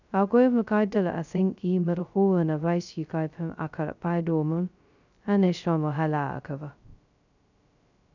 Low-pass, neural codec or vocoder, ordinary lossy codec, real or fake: 7.2 kHz; codec, 16 kHz, 0.2 kbps, FocalCodec; none; fake